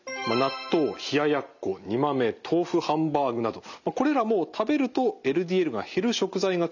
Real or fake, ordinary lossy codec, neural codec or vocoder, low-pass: real; none; none; 7.2 kHz